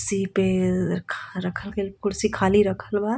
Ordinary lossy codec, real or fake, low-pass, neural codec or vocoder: none; real; none; none